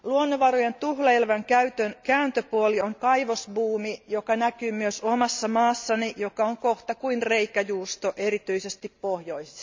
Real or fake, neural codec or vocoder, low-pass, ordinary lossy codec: real; none; 7.2 kHz; MP3, 64 kbps